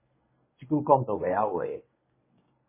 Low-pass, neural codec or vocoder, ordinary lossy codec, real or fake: 3.6 kHz; codec, 24 kHz, 0.9 kbps, WavTokenizer, medium speech release version 1; MP3, 16 kbps; fake